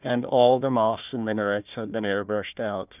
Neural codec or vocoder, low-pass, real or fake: codec, 16 kHz, 1 kbps, FunCodec, trained on Chinese and English, 50 frames a second; 3.6 kHz; fake